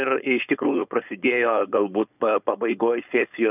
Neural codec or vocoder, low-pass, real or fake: codec, 16 kHz, 4.8 kbps, FACodec; 3.6 kHz; fake